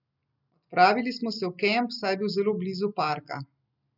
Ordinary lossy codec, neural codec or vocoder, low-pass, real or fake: none; none; 5.4 kHz; real